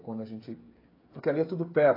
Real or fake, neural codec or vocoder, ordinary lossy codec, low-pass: fake; codec, 16 kHz, 16 kbps, FreqCodec, smaller model; AAC, 24 kbps; 5.4 kHz